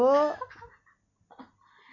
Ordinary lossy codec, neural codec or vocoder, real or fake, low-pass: none; none; real; 7.2 kHz